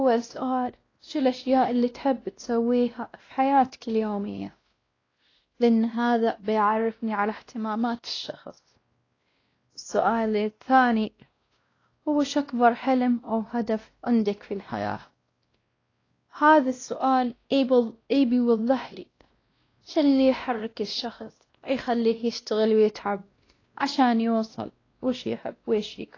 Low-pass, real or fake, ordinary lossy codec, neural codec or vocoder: 7.2 kHz; fake; AAC, 32 kbps; codec, 16 kHz, 1 kbps, X-Codec, WavLM features, trained on Multilingual LibriSpeech